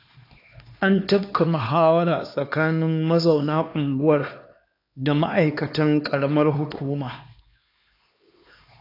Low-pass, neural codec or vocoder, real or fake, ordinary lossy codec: 5.4 kHz; codec, 16 kHz, 2 kbps, X-Codec, HuBERT features, trained on LibriSpeech; fake; AAC, 32 kbps